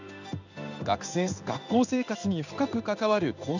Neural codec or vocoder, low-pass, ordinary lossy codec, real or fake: codec, 16 kHz in and 24 kHz out, 1 kbps, XY-Tokenizer; 7.2 kHz; none; fake